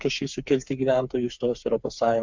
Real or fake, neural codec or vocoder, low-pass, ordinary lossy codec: fake; codec, 16 kHz, 4 kbps, FreqCodec, smaller model; 7.2 kHz; MP3, 64 kbps